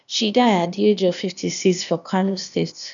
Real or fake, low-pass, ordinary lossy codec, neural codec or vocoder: fake; 7.2 kHz; none; codec, 16 kHz, 0.8 kbps, ZipCodec